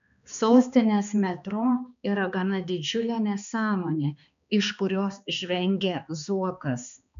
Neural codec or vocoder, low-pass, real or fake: codec, 16 kHz, 2 kbps, X-Codec, HuBERT features, trained on balanced general audio; 7.2 kHz; fake